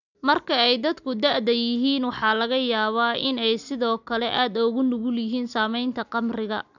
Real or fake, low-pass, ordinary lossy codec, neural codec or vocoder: real; 7.2 kHz; none; none